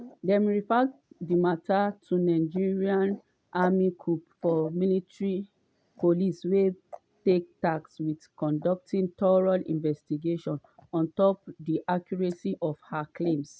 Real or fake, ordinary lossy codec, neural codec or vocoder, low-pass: real; none; none; none